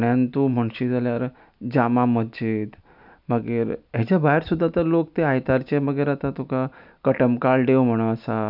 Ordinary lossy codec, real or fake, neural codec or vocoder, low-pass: none; real; none; 5.4 kHz